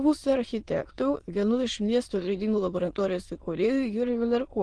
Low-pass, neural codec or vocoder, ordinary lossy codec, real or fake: 9.9 kHz; autoencoder, 22.05 kHz, a latent of 192 numbers a frame, VITS, trained on many speakers; Opus, 16 kbps; fake